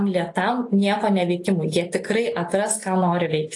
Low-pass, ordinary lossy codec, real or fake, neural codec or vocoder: 10.8 kHz; AAC, 48 kbps; real; none